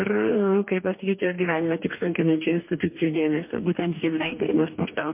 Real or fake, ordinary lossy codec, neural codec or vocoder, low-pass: fake; MP3, 32 kbps; codec, 44.1 kHz, 2.6 kbps, DAC; 3.6 kHz